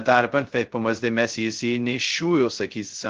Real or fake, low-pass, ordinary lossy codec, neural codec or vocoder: fake; 7.2 kHz; Opus, 16 kbps; codec, 16 kHz, 0.2 kbps, FocalCodec